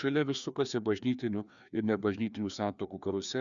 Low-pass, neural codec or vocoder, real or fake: 7.2 kHz; codec, 16 kHz, 2 kbps, FreqCodec, larger model; fake